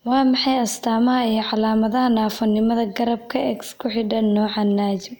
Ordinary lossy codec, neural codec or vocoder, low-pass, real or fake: none; none; none; real